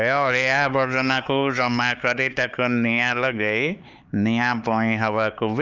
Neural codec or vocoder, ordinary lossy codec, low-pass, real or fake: codec, 16 kHz, 4 kbps, X-Codec, HuBERT features, trained on LibriSpeech; Opus, 24 kbps; 7.2 kHz; fake